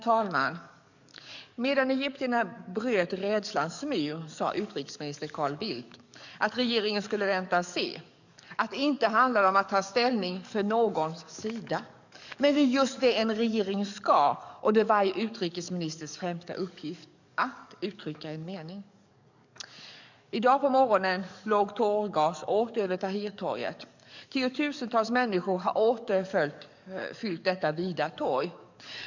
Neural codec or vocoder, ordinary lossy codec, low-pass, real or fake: codec, 44.1 kHz, 7.8 kbps, DAC; none; 7.2 kHz; fake